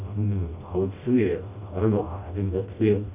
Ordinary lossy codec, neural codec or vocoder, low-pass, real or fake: none; codec, 16 kHz, 1 kbps, FreqCodec, smaller model; 3.6 kHz; fake